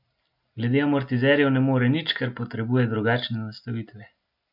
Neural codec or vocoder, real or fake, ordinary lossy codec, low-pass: none; real; none; 5.4 kHz